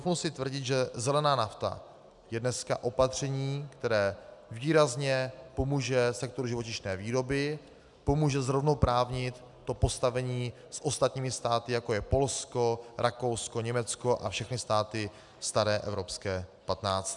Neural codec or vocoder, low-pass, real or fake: none; 10.8 kHz; real